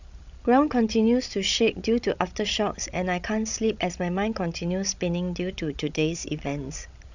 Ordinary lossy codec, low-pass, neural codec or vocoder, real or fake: none; 7.2 kHz; codec, 16 kHz, 16 kbps, FreqCodec, larger model; fake